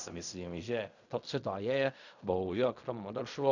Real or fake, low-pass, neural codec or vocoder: fake; 7.2 kHz; codec, 16 kHz in and 24 kHz out, 0.4 kbps, LongCat-Audio-Codec, fine tuned four codebook decoder